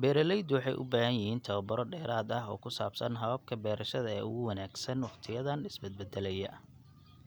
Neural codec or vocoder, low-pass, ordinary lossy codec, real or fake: none; none; none; real